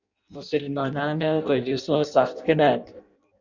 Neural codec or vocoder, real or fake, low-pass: codec, 16 kHz in and 24 kHz out, 0.6 kbps, FireRedTTS-2 codec; fake; 7.2 kHz